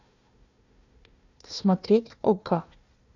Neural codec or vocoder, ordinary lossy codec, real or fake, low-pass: codec, 16 kHz, 1 kbps, FunCodec, trained on Chinese and English, 50 frames a second; none; fake; 7.2 kHz